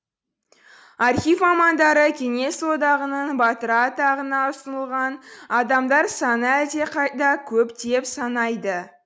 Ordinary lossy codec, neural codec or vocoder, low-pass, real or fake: none; none; none; real